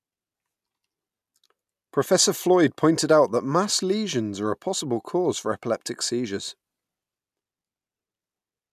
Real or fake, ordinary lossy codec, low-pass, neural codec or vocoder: real; none; 14.4 kHz; none